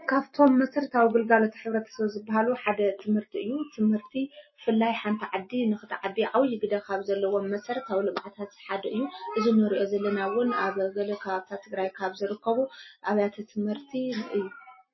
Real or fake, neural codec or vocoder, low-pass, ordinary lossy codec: real; none; 7.2 kHz; MP3, 24 kbps